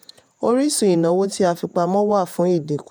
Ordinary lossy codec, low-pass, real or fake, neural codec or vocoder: none; none; fake; vocoder, 48 kHz, 128 mel bands, Vocos